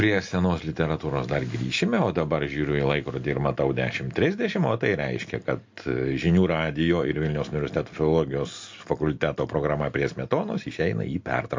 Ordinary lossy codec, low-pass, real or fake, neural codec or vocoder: MP3, 48 kbps; 7.2 kHz; real; none